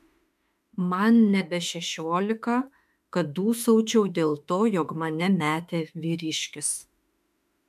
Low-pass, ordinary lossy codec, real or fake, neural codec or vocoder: 14.4 kHz; MP3, 96 kbps; fake; autoencoder, 48 kHz, 32 numbers a frame, DAC-VAE, trained on Japanese speech